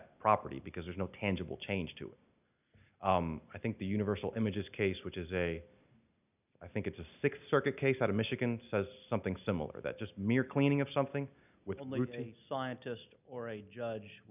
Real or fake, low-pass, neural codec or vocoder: real; 3.6 kHz; none